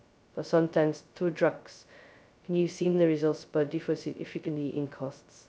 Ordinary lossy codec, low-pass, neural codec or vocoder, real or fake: none; none; codec, 16 kHz, 0.2 kbps, FocalCodec; fake